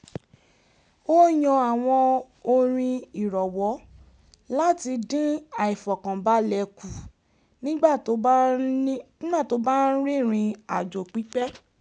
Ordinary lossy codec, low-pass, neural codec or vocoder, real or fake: none; 9.9 kHz; none; real